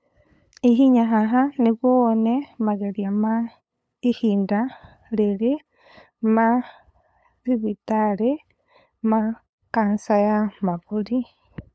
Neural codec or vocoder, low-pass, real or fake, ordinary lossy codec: codec, 16 kHz, 8 kbps, FunCodec, trained on LibriTTS, 25 frames a second; none; fake; none